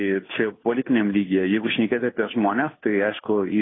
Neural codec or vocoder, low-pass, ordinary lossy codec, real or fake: codec, 16 kHz, 2 kbps, FunCodec, trained on Chinese and English, 25 frames a second; 7.2 kHz; AAC, 16 kbps; fake